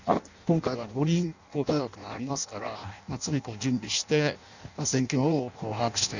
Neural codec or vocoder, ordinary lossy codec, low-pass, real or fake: codec, 16 kHz in and 24 kHz out, 0.6 kbps, FireRedTTS-2 codec; none; 7.2 kHz; fake